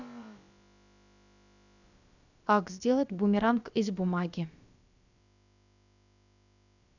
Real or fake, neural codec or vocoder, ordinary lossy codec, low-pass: fake; codec, 16 kHz, about 1 kbps, DyCAST, with the encoder's durations; none; 7.2 kHz